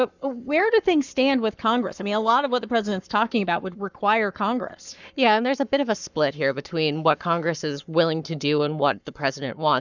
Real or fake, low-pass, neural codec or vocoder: fake; 7.2 kHz; codec, 44.1 kHz, 7.8 kbps, Pupu-Codec